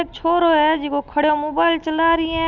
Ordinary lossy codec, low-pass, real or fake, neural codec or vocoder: none; 7.2 kHz; real; none